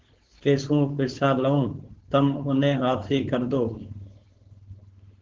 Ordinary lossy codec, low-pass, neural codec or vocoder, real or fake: Opus, 16 kbps; 7.2 kHz; codec, 16 kHz, 4.8 kbps, FACodec; fake